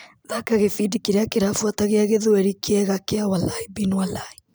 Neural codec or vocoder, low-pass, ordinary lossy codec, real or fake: none; none; none; real